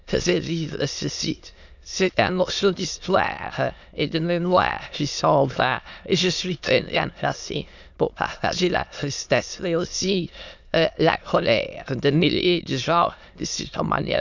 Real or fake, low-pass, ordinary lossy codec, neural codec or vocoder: fake; 7.2 kHz; none; autoencoder, 22.05 kHz, a latent of 192 numbers a frame, VITS, trained on many speakers